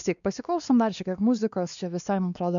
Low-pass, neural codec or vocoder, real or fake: 7.2 kHz; codec, 16 kHz, 2 kbps, X-Codec, WavLM features, trained on Multilingual LibriSpeech; fake